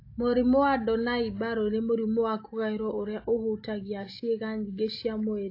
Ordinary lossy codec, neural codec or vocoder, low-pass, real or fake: AAC, 32 kbps; none; 5.4 kHz; real